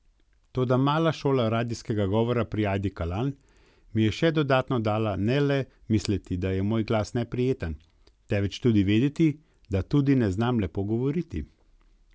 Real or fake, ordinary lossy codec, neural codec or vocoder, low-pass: real; none; none; none